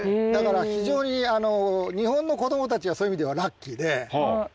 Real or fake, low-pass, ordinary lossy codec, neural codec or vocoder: real; none; none; none